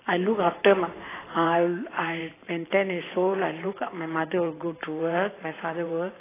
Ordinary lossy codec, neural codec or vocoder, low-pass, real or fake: AAC, 16 kbps; vocoder, 44.1 kHz, 128 mel bands every 512 samples, BigVGAN v2; 3.6 kHz; fake